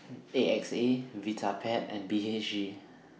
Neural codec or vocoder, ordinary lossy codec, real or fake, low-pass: none; none; real; none